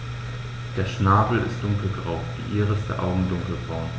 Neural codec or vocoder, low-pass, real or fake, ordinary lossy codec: none; none; real; none